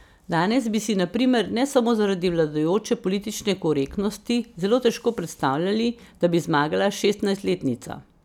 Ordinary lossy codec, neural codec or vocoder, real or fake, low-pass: none; none; real; 19.8 kHz